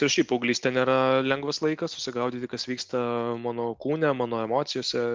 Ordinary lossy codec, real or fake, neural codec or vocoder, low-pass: Opus, 32 kbps; real; none; 7.2 kHz